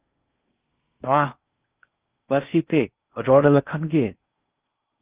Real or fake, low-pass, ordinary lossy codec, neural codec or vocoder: fake; 3.6 kHz; Opus, 24 kbps; codec, 16 kHz in and 24 kHz out, 0.6 kbps, FocalCodec, streaming, 4096 codes